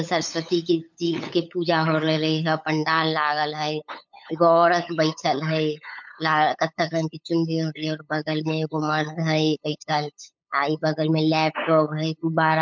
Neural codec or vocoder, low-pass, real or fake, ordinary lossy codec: codec, 16 kHz, 8 kbps, FunCodec, trained on LibriTTS, 25 frames a second; 7.2 kHz; fake; MP3, 64 kbps